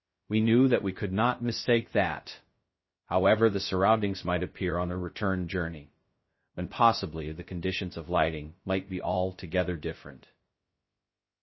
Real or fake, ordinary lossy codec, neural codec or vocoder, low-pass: fake; MP3, 24 kbps; codec, 16 kHz, 0.2 kbps, FocalCodec; 7.2 kHz